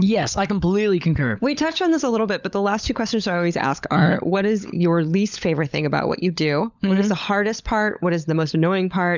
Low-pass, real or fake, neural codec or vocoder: 7.2 kHz; fake; codec, 16 kHz, 16 kbps, FunCodec, trained on LibriTTS, 50 frames a second